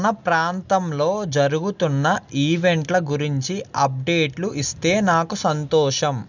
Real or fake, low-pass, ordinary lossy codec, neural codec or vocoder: real; 7.2 kHz; none; none